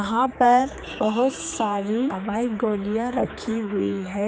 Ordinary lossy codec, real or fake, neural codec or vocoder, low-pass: none; fake; codec, 16 kHz, 4 kbps, X-Codec, HuBERT features, trained on general audio; none